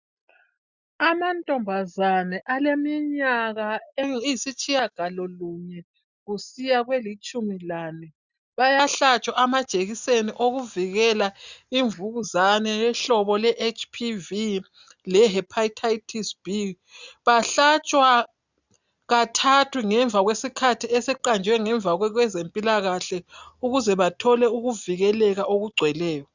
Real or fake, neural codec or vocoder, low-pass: real; none; 7.2 kHz